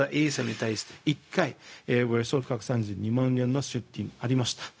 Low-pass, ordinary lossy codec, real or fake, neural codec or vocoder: none; none; fake; codec, 16 kHz, 0.4 kbps, LongCat-Audio-Codec